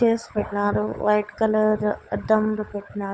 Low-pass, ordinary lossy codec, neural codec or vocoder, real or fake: none; none; codec, 16 kHz, 16 kbps, FunCodec, trained on LibriTTS, 50 frames a second; fake